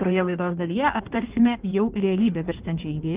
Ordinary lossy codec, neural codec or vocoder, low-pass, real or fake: Opus, 24 kbps; codec, 16 kHz in and 24 kHz out, 1.1 kbps, FireRedTTS-2 codec; 3.6 kHz; fake